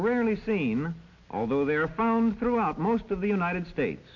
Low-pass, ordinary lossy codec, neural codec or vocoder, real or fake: 7.2 kHz; AAC, 48 kbps; none; real